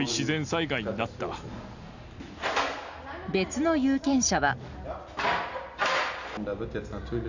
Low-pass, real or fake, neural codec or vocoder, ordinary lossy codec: 7.2 kHz; real; none; none